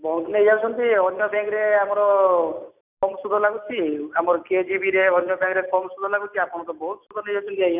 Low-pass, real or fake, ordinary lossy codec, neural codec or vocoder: 3.6 kHz; real; none; none